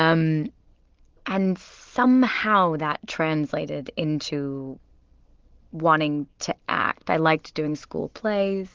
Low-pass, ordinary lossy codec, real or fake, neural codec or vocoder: 7.2 kHz; Opus, 16 kbps; real; none